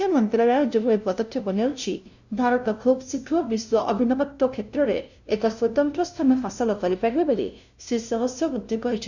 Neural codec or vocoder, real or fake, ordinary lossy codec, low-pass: codec, 16 kHz, 0.5 kbps, FunCodec, trained on LibriTTS, 25 frames a second; fake; none; 7.2 kHz